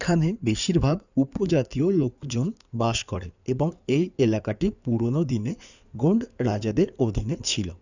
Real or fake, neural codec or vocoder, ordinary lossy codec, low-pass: fake; codec, 16 kHz in and 24 kHz out, 2.2 kbps, FireRedTTS-2 codec; none; 7.2 kHz